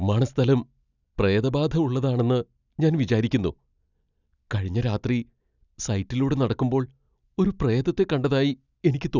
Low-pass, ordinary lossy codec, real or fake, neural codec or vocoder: 7.2 kHz; none; real; none